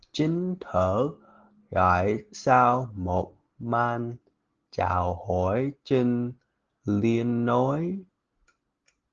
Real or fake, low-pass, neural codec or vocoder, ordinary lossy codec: real; 7.2 kHz; none; Opus, 16 kbps